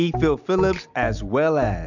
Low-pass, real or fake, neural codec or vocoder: 7.2 kHz; real; none